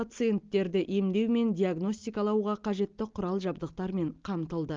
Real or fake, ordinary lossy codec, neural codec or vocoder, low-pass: real; Opus, 32 kbps; none; 7.2 kHz